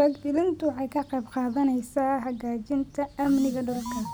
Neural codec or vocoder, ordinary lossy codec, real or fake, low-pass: none; none; real; none